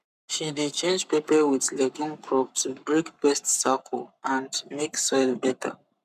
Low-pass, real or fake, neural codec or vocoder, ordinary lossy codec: 14.4 kHz; fake; codec, 44.1 kHz, 7.8 kbps, Pupu-Codec; none